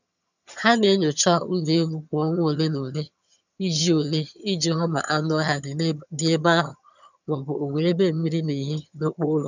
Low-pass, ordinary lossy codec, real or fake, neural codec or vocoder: 7.2 kHz; none; fake; vocoder, 22.05 kHz, 80 mel bands, HiFi-GAN